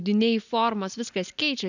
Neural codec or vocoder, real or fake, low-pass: none; real; 7.2 kHz